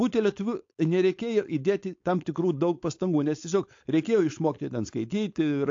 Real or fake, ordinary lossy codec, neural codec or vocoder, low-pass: fake; AAC, 48 kbps; codec, 16 kHz, 4.8 kbps, FACodec; 7.2 kHz